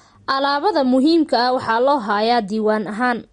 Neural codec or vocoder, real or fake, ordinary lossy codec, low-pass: none; real; MP3, 48 kbps; 19.8 kHz